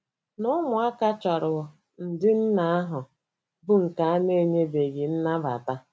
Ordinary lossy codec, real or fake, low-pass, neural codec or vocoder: none; real; none; none